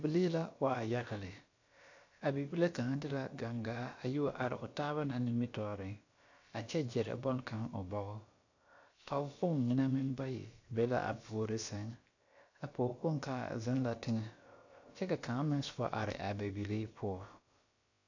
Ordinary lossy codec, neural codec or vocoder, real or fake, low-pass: AAC, 48 kbps; codec, 16 kHz, about 1 kbps, DyCAST, with the encoder's durations; fake; 7.2 kHz